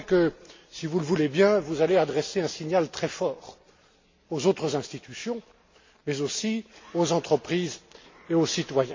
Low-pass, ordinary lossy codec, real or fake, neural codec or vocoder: 7.2 kHz; MP3, 32 kbps; real; none